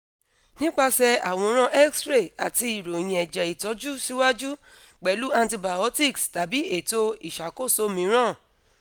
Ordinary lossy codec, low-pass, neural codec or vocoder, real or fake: none; none; none; real